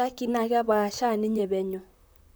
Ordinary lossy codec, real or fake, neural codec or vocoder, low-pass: none; fake; vocoder, 44.1 kHz, 128 mel bands, Pupu-Vocoder; none